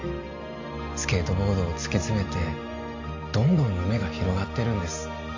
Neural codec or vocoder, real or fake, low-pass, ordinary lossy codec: none; real; 7.2 kHz; AAC, 48 kbps